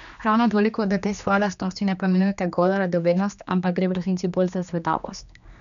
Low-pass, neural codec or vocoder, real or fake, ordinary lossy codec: 7.2 kHz; codec, 16 kHz, 2 kbps, X-Codec, HuBERT features, trained on general audio; fake; none